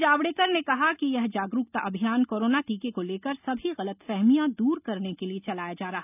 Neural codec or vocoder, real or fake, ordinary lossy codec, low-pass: none; real; none; 3.6 kHz